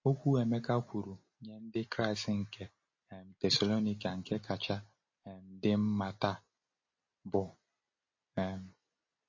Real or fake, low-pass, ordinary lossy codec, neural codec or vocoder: real; 7.2 kHz; MP3, 32 kbps; none